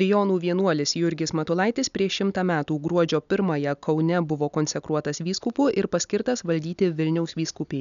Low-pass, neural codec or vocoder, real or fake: 7.2 kHz; none; real